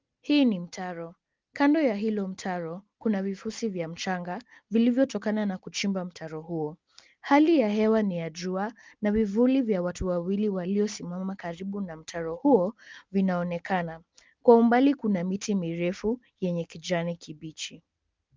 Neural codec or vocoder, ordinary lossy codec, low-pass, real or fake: none; Opus, 24 kbps; 7.2 kHz; real